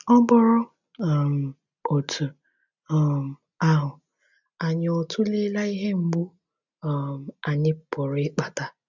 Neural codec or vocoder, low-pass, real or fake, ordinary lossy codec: none; 7.2 kHz; real; none